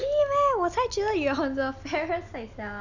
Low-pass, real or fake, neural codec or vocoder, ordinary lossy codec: 7.2 kHz; real; none; none